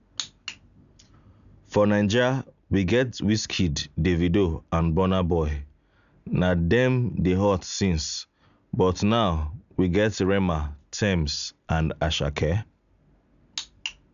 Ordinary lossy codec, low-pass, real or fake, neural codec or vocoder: none; 7.2 kHz; real; none